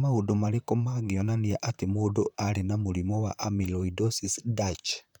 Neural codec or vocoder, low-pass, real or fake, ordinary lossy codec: vocoder, 44.1 kHz, 128 mel bands, Pupu-Vocoder; none; fake; none